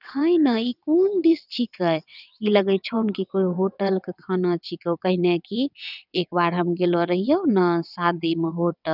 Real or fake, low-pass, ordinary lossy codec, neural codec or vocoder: fake; 5.4 kHz; none; vocoder, 22.05 kHz, 80 mel bands, WaveNeXt